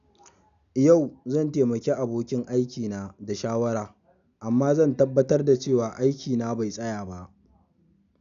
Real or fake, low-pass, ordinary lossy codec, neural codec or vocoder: real; 7.2 kHz; none; none